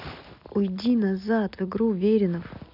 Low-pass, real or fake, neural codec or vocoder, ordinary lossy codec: 5.4 kHz; real; none; none